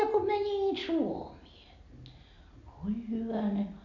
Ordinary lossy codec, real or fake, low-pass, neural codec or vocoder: MP3, 64 kbps; real; 7.2 kHz; none